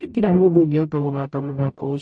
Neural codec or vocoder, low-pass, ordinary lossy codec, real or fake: codec, 44.1 kHz, 0.9 kbps, DAC; 9.9 kHz; MP3, 48 kbps; fake